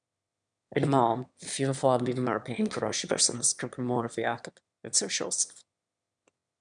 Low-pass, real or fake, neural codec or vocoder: 9.9 kHz; fake; autoencoder, 22.05 kHz, a latent of 192 numbers a frame, VITS, trained on one speaker